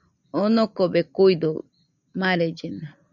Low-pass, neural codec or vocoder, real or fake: 7.2 kHz; none; real